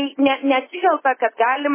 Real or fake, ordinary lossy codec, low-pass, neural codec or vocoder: real; MP3, 16 kbps; 3.6 kHz; none